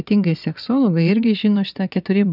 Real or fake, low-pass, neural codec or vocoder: fake; 5.4 kHz; vocoder, 22.05 kHz, 80 mel bands, WaveNeXt